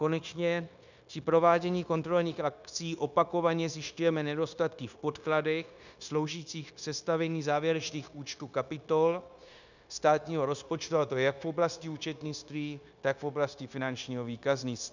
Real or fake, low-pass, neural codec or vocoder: fake; 7.2 kHz; codec, 16 kHz, 0.9 kbps, LongCat-Audio-Codec